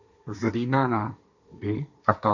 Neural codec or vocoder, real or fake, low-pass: codec, 16 kHz, 1.1 kbps, Voila-Tokenizer; fake; 7.2 kHz